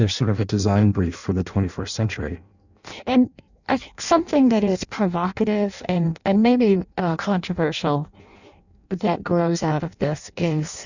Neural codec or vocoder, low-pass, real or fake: codec, 16 kHz in and 24 kHz out, 0.6 kbps, FireRedTTS-2 codec; 7.2 kHz; fake